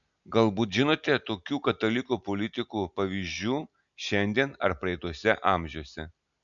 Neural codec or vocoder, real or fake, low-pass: none; real; 7.2 kHz